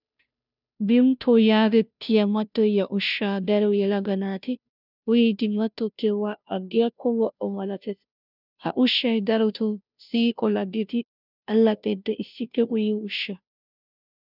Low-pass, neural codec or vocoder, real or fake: 5.4 kHz; codec, 16 kHz, 0.5 kbps, FunCodec, trained on Chinese and English, 25 frames a second; fake